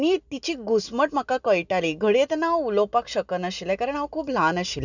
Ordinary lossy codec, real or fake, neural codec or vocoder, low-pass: none; real; none; 7.2 kHz